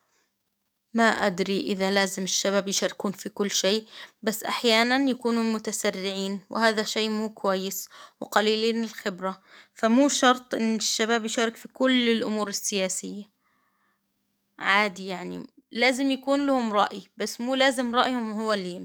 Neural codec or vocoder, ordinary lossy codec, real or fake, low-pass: codec, 44.1 kHz, 7.8 kbps, DAC; none; fake; none